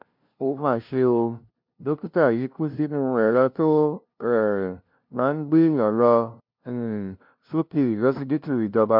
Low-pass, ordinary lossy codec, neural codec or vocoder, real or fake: 5.4 kHz; MP3, 48 kbps; codec, 16 kHz, 0.5 kbps, FunCodec, trained on LibriTTS, 25 frames a second; fake